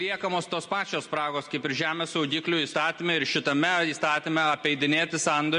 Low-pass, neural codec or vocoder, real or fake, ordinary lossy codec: 14.4 kHz; none; real; MP3, 48 kbps